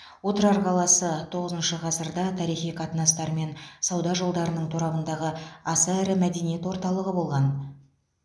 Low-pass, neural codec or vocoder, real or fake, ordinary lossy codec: none; none; real; none